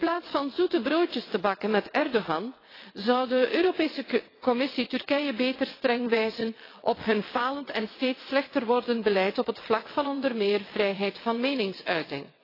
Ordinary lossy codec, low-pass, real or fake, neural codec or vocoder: AAC, 24 kbps; 5.4 kHz; fake; vocoder, 22.05 kHz, 80 mel bands, WaveNeXt